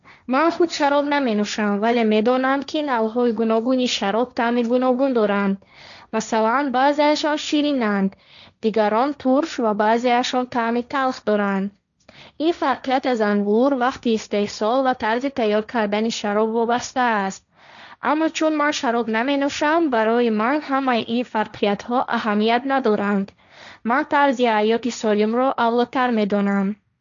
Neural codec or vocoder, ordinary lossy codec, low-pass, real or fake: codec, 16 kHz, 1.1 kbps, Voila-Tokenizer; none; 7.2 kHz; fake